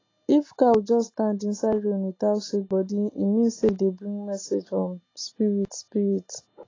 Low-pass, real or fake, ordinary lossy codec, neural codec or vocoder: 7.2 kHz; real; AAC, 32 kbps; none